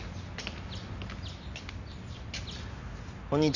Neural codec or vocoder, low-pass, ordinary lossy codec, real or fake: none; 7.2 kHz; none; real